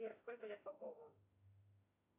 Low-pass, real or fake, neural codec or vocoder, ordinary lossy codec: 3.6 kHz; fake; autoencoder, 48 kHz, 32 numbers a frame, DAC-VAE, trained on Japanese speech; AAC, 24 kbps